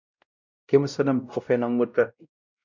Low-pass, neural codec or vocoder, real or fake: 7.2 kHz; codec, 16 kHz, 0.5 kbps, X-Codec, WavLM features, trained on Multilingual LibriSpeech; fake